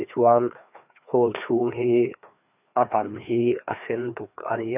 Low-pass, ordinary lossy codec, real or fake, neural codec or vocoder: 3.6 kHz; none; fake; codec, 16 kHz, 2 kbps, FreqCodec, larger model